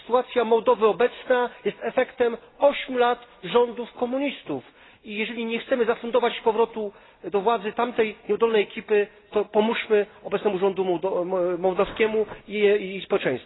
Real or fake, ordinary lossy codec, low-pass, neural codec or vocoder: real; AAC, 16 kbps; 7.2 kHz; none